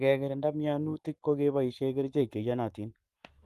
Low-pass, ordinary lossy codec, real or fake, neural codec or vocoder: 14.4 kHz; Opus, 32 kbps; fake; codec, 44.1 kHz, 7.8 kbps, Pupu-Codec